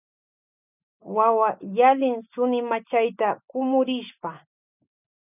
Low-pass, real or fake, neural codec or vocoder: 3.6 kHz; real; none